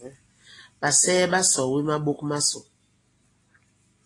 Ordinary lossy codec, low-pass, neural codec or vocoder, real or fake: AAC, 32 kbps; 10.8 kHz; none; real